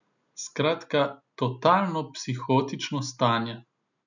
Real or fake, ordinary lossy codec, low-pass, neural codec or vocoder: real; none; 7.2 kHz; none